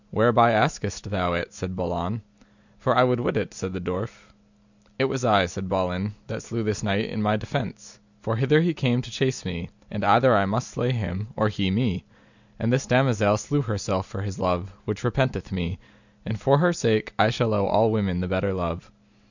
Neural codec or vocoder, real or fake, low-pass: none; real; 7.2 kHz